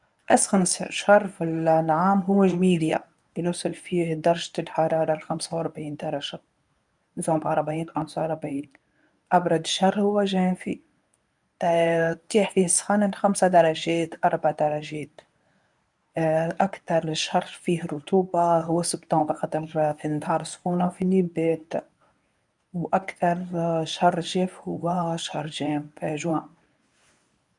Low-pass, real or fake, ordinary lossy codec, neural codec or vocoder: 10.8 kHz; fake; none; codec, 24 kHz, 0.9 kbps, WavTokenizer, medium speech release version 1